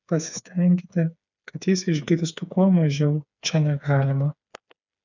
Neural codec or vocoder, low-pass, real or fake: codec, 16 kHz, 4 kbps, FreqCodec, smaller model; 7.2 kHz; fake